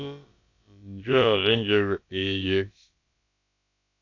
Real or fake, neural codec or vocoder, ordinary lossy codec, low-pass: fake; codec, 16 kHz, about 1 kbps, DyCAST, with the encoder's durations; AAC, 48 kbps; 7.2 kHz